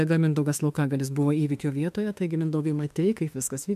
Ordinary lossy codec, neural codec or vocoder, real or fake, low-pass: MP3, 96 kbps; autoencoder, 48 kHz, 32 numbers a frame, DAC-VAE, trained on Japanese speech; fake; 14.4 kHz